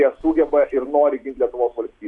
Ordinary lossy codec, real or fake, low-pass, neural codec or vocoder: AAC, 64 kbps; real; 10.8 kHz; none